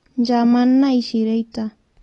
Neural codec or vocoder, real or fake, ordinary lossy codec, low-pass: none; real; AAC, 32 kbps; 10.8 kHz